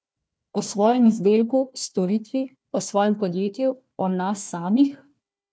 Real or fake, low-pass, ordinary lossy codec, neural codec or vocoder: fake; none; none; codec, 16 kHz, 1 kbps, FunCodec, trained on Chinese and English, 50 frames a second